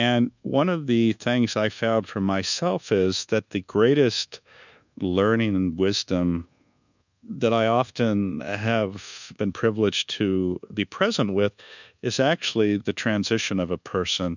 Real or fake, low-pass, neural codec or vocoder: fake; 7.2 kHz; codec, 24 kHz, 1.2 kbps, DualCodec